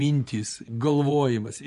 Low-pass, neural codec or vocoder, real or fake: 10.8 kHz; vocoder, 24 kHz, 100 mel bands, Vocos; fake